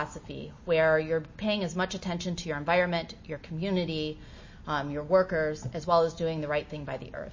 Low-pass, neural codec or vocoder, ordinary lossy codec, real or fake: 7.2 kHz; none; MP3, 32 kbps; real